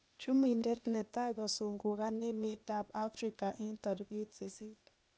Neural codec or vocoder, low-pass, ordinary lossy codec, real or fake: codec, 16 kHz, 0.8 kbps, ZipCodec; none; none; fake